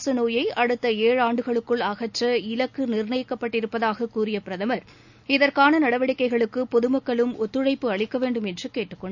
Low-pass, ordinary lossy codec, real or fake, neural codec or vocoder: 7.2 kHz; none; real; none